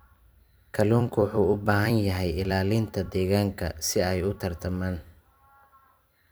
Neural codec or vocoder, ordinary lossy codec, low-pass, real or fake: vocoder, 44.1 kHz, 128 mel bands every 256 samples, BigVGAN v2; none; none; fake